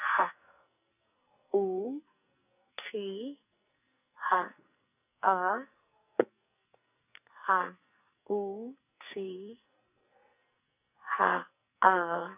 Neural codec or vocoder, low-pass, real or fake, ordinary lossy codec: codec, 44.1 kHz, 2.6 kbps, SNAC; 3.6 kHz; fake; none